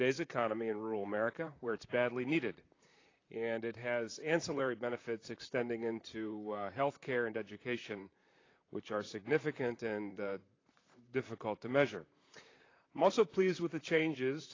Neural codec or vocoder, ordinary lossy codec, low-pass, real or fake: vocoder, 44.1 kHz, 128 mel bands, Pupu-Vocoder; AAC, 32 kbps; 7.2 kHz; fake